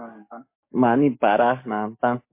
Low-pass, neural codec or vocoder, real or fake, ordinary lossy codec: 3.6 kHz; codec, 44.1 kHz, 7.8 kbps, DAC; fake; MP3, 24 kbps